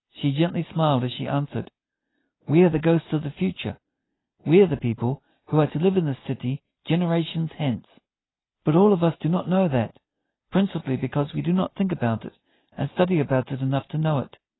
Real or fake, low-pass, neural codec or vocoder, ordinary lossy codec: real; 7.2 kHz; none; AAC, 16 kbps